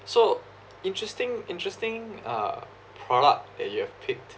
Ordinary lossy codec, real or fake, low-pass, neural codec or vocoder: none; real; none; none